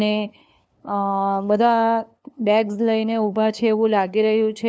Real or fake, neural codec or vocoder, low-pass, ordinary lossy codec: fake; codec, 16 kHz, 2 kbps, FunCodec, trained on LibriTTS, 25 frames a second; none; none